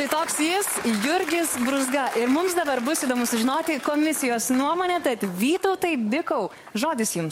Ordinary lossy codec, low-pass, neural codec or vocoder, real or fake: MP3, 64 kbps; 19.8 kHz; codec, 44.1 kHz, 7.8 kbps, DAC; fake